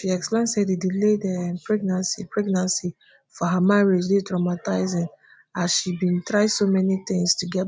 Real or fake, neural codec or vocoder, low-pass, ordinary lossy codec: real; none; none; none